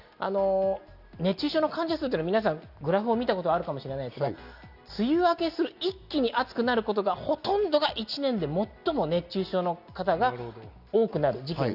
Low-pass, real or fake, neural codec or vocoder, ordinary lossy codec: 5.4 kHz; real; none; Opus, 64 kbps